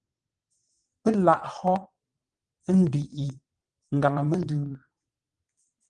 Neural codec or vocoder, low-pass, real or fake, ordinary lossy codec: vocoder, 22.05 kHz, 80 mel bands, WaveNeXt; 9.9 kHz; fake; Opus, 24 kbps